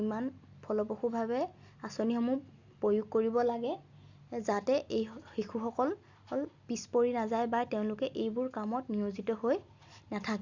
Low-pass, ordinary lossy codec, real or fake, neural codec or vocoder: 7.2 kHz; Opus, 64 kbps; real; none